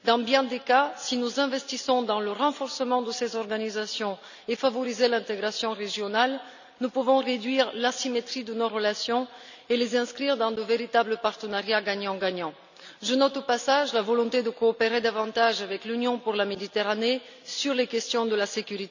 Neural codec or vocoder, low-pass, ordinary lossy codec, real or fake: none; 7.2 kHz; none; real